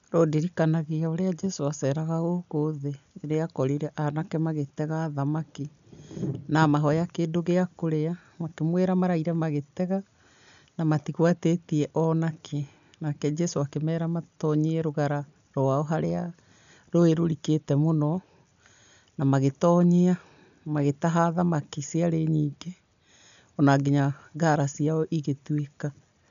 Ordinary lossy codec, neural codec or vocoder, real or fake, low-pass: none; none; real; 7.2 kHz